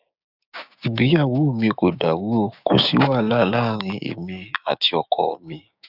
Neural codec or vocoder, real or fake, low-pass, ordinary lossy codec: codec, 16 kHz, 6 kbps, DAC; fake; 5.4 kHz; none